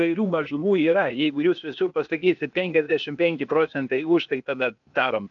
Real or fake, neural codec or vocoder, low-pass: fake; codec, 16 kHz, 0.8 kbps, ZipCodec; 7.2 kHz